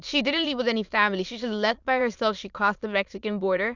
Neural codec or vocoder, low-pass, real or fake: autoencoder, 22.05 kHz, a latent of 192 numbers a frame, VITS, trained on many speakers; 7.2 kHz; fake